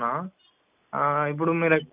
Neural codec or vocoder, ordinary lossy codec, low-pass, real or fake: none; none; 3.6 kHz; real